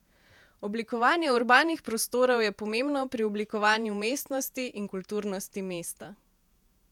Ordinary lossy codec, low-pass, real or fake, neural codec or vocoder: none; 19.8 kHz; fake; vocoder, 48 kHz, 128 mel bands, Vocos